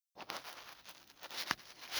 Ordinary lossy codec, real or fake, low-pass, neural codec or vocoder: none; real; none; none